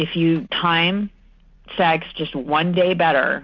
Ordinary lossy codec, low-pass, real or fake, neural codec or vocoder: MP3, 64 kbps; 7.2 kHz; real; none